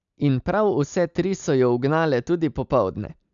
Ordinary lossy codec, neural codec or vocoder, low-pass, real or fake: none; none; 7.2 kHz; real